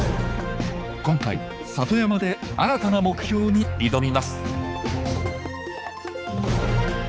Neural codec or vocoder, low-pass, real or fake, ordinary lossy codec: codec, 16 kHz, 4 kbps, X-Codec, HuBERT features, trained on balanced general audio; none; fake; none